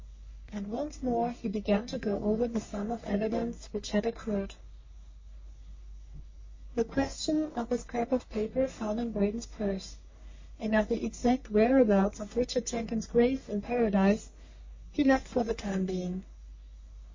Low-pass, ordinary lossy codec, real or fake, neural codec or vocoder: 7.2 kHz; MP3, 32 kbps; fake; codec, 44.1 kHz, 3.4 kbps, Pupu-Codec